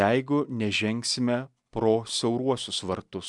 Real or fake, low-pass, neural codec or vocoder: real; 10.8 kHz; none